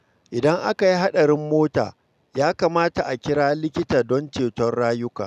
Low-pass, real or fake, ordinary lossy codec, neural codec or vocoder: 14.4 kHz; real; none; none